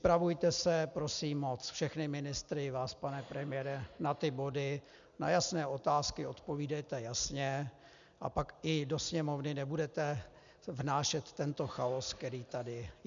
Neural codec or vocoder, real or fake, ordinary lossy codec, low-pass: none; real; MP3, 96 kbps; 7.2 kHz